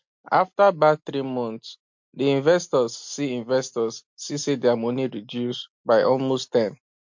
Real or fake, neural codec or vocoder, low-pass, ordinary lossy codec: real; none; 7.2 kHz; MP3, 48 kbps